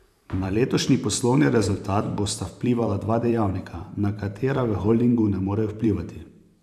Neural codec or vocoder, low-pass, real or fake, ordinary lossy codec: vocoder, 44.1 kHz, 128 mel bands every 512 samples, BigVGAN v2; 14.4 kHz; fake; none